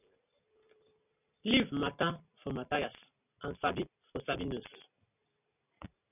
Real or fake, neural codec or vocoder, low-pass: real; none; 3.6 kHz